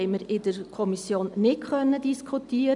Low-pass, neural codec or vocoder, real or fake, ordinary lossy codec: 10.8 kHz; none; real; none